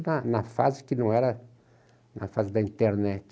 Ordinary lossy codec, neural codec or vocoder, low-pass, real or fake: none; none; none; real